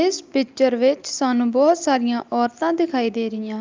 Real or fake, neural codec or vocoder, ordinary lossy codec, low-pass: real; none; Opus, 24 kbps; 7.2 kHz